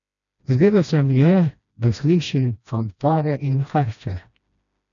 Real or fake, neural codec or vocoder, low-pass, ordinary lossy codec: fake; codec, 16 kHz, 1 kbps, FreqCodec, smaller model; 7.2 kHz; none